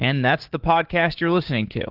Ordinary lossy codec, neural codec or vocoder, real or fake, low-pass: Opus, 16 kbps; codec, 16 kHz, 6 kbps, DAC; fake; 5.4 kHz